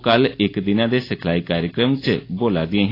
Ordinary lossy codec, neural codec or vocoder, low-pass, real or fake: AAC, 24 kbps; none; 5.4 kHz; real